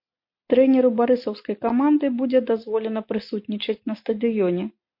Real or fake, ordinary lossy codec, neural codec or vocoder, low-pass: real; MP3, 32 kbps; none; 5.4 kHz